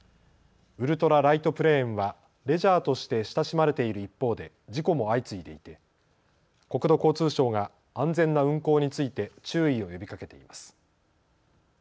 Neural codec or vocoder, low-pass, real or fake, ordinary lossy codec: none; none; real; none